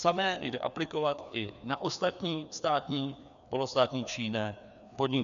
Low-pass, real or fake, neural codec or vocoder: 7.2 kHz; fake; codec, 16 kHz, 2 kbps, FreqCodec, larger model